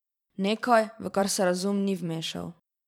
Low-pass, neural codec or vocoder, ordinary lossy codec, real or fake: 19.8 kHz; vocoder, 44.1 kHz, 128 mel bands every 512 samples, BigVGAN v2; none; fake